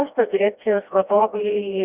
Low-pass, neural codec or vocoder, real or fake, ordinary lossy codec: 3.6 kHz; codec, 16 kHz, 1 kbps, FreqCodec, smaller model; fake; Opus, 64 kbps